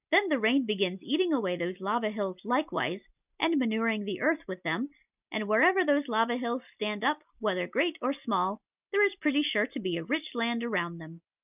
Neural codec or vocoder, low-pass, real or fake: none; 3.6 kHz; real